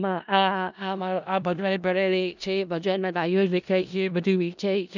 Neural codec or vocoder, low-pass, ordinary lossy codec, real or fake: codec, 16 kHz in and 24 kHz out, 0.4 kbps, LongCat-Audio-Codec, four codebook decoder; 7.2 kHz; none; fake